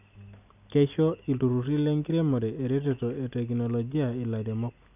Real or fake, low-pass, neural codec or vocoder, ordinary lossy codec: real; 3.6 kHz; none; none